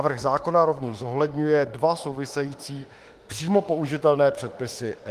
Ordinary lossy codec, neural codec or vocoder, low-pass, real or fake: Opus, 24 kbps; autoencoder, 48 kHz, 32 numbers a frame, DAC-VAE, trained on Japanese speech; 14.4 kHz; fake